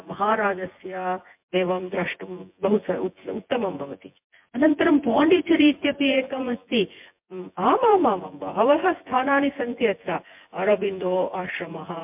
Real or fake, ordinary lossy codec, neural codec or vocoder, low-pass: fake; MP3, 32 kbps; vocoder, 24 kHz, 100 mel bands, Vocos; 3.6 kHz